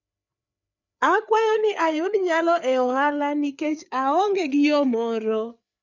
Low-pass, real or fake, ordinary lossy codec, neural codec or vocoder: 7.2 kHz; fake; none; codec, 16 kHz, 4 kbps, FreqCodec, larger model